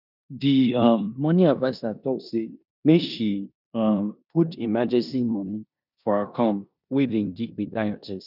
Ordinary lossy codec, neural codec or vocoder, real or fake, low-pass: none; codec, 16 kHz in and 24 kHz out, 0.9 kbps, LongCat-Audio-Codec, four codebook decoder; fake; 5.4 kHz